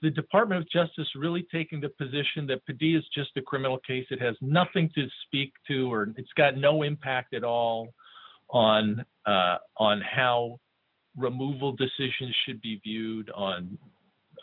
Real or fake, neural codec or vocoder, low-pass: real; none; 5.4 kHz